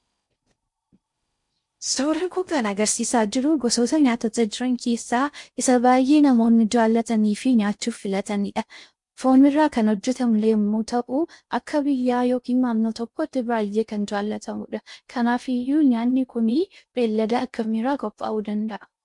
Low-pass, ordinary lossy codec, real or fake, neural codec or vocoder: 10.8 kHz; MP3, 64 kbps; fake; codec, 16 kHz in and 24 kHz out, 0.6 kbps, FocalCodec, streaming, 4096 codes